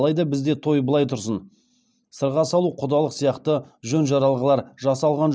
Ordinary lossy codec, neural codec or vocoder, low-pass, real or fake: none; none; none; real